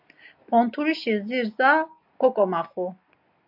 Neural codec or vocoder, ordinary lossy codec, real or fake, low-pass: none; AAC, 48 kbps; real; 5.4 kHz